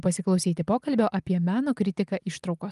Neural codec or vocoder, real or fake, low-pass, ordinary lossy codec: none; real; 10.8 kHz; Opus, 32 kbps